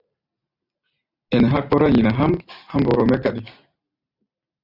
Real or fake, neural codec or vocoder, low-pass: real; none; 5.4 kHz